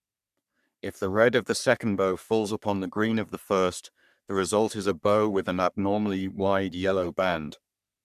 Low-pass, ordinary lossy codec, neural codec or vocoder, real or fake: 14.4 kHz; none; codec, 44.1 kHz, 3.4 kbps, Pupu-Codec; fake